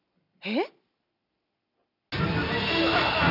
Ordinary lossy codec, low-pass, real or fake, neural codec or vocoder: none; 5.4 kHz; real; none